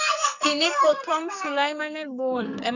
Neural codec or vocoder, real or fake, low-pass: codec, 44.1 kHz, 2.6 kbps, SNAC; fake; 7.2 kHz